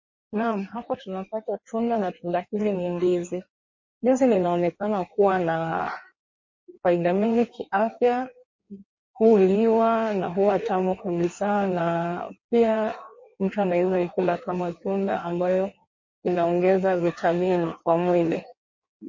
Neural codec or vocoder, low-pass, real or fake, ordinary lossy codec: codec, 16 kHz in and 24 kHz out, 1.1 kbps, FireRedTTS-2 codec; 7.2 kHz; fake; MP3, 32 kbps